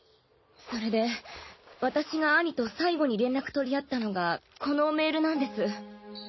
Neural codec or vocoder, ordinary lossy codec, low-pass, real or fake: none; MP3, 24 kbps; 7.2 kHz; real